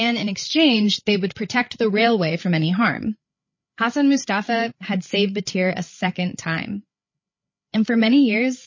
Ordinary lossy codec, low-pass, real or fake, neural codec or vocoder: MP3, 32 kbps; 7.2 kHz; fake; codec, 16 kHz, 8 kbps, FreqCodec, larger model